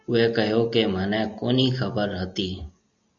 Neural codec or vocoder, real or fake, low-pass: none; real; 7.2 kHz